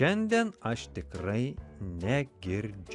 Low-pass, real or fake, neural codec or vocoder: 10.8 kHz; real; none